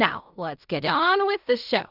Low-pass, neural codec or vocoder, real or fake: 5.4 kHz; codec, 16 kHz in and 24 kHz out, 0.4 kbps, LongCat-Audio-Codec, fine tuned four codebook decoder; fake